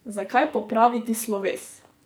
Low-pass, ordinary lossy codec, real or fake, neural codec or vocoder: none; none; fake; codec, 44.1 kHz, 2.6 kbps, SNAC